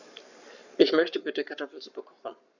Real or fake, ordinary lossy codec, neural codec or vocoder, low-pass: fake; none; codec, 44.1 kHz, 7.8 kbps, Pupu-Codec; 7.2 kHz